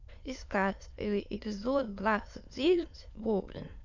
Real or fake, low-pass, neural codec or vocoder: fake; 7.2 kHz; autoencoder, 22.05 kHz, a latent of 192 numbers a frame, VITS, trained on many speakers